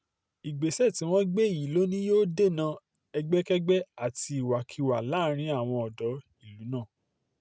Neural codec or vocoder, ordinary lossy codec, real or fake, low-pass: none; none; real; none